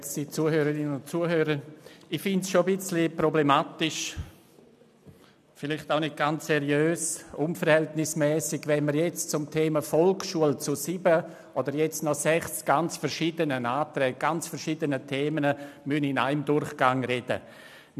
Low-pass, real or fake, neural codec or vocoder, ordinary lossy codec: 14.4 kHz; real; none; none